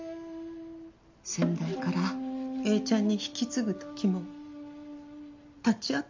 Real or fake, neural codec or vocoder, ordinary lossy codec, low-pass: real; none; MP3, 64 kbps; 7.2 kHz